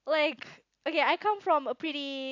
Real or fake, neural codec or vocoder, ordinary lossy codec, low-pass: real; none; AAC, 48 kbps; 7.2 kHz